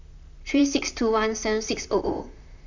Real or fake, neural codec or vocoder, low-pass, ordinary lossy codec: fake; vocoder, 44.1 kHz, 128 mel bands, Pupu-Vocoder; 7.2 kHz; none